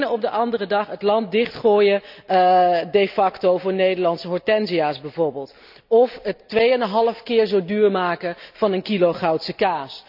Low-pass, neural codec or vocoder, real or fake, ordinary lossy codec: 5.4 kHz; none; real; none